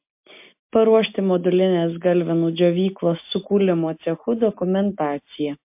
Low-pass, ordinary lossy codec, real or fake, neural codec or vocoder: 3.6 kHz; MP3, 32 kbps; real; none